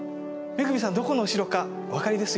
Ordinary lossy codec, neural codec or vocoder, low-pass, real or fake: none; none; none; real